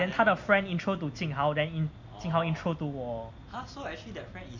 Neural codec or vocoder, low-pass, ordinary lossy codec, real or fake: none; 7.2 kHz; MP3, 48 kbps; real